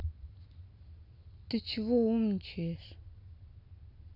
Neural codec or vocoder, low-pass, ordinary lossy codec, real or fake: none; 5.4 kHz; none; real